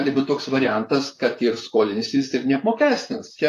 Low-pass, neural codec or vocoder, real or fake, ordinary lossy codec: 14.4 kHz; vocoder, 44.1 kHz, 128 mel bands, Pupu-Vocoder; fake; AAC, 64 kbps